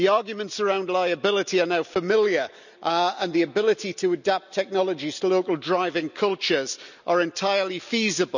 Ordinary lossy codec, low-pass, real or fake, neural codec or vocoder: none; 7.2 kHz; real; none